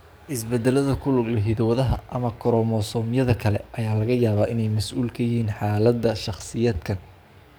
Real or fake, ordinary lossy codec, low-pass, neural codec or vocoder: fake; none; none; codec, 44.1 kHz, 7.8 kbps, DAC